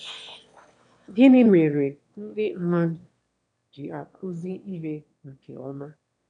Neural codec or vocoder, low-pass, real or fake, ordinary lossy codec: autoencoder, 22.05 kHz, a latent of 192 numbers a frame, VITS, trained on one speaker; 9.9 kHz; fake; none